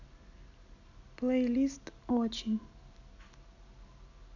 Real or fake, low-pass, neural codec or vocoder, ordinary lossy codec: real; 7.2 kHz; none; none